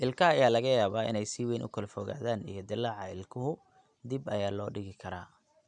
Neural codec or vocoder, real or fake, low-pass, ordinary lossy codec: none; real; 9.9 kHz; none